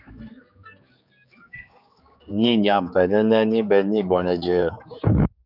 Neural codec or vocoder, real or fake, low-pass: codec, 16 kHz, 4 kbps, X-Codec, HuBERT features, trained on general audio; fake; 5.4 kHz